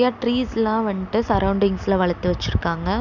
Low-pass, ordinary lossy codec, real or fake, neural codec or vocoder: 7.2 kHz; none; real; none